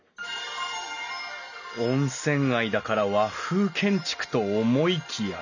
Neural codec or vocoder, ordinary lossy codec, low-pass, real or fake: none; none; 7.2 kHz; real